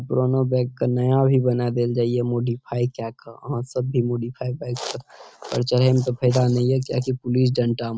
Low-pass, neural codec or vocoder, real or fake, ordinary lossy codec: none; none; real; none